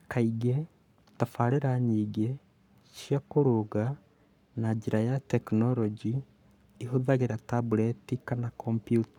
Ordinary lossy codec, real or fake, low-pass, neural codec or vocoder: none; fake; 19.8 kHz; codec, 44.1 kHz, 7.8 kbps, Pupu-Codec